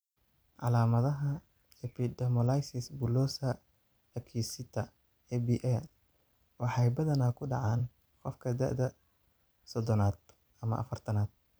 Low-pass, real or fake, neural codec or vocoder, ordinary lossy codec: none; fake; vocoder, 44.1 kHz, 128 mel bands every 256 samples, BigVGAN v2; none